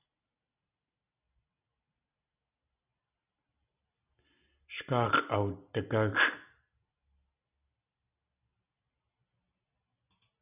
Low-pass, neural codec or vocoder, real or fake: 3.6 kHz; none; real